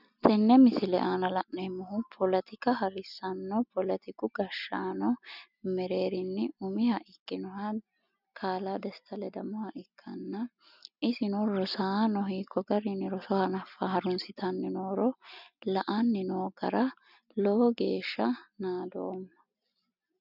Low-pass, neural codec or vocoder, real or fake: 5.4 kHz; none; real